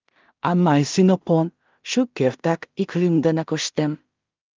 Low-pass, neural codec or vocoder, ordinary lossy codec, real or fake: 7.2 kHz; codec, 16 kHz in and 24 kHz out, 0.4 kbps, LongCat-Audio-Codec, two codebook decoder; Opus, 32 kbps; fake